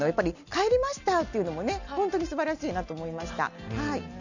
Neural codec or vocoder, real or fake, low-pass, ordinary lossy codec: none; real; 7.2 kHz; none